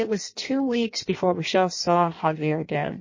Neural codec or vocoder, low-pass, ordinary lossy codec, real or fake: codec, 16 kHz in and 24 kHz out, 0.6 kbps, FireRedTTS-2 codec; 7.2 kHz; MP3, 32 kbps; fake